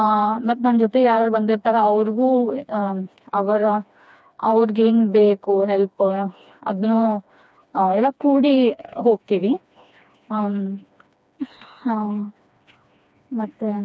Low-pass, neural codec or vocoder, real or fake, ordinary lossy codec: none; codec, 16 kHz, 2 kbps, FreqCodec, smaller model; fake; none